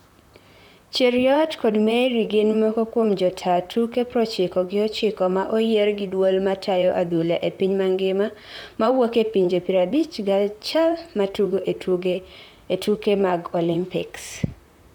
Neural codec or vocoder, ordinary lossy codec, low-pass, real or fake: vocoder, 44.1 kHz, 128 mel bands, Pupu-Vocoder; none; 19.8 kHz; fake